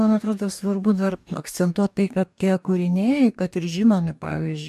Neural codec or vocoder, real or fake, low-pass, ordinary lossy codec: codec, 44.1 kHz, 2.6 kbps, DAC; fake; 14.4 kHz; MP3, 96 kbps